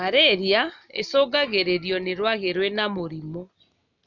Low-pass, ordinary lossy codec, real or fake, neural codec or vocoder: 7.2 kHz; none; real; none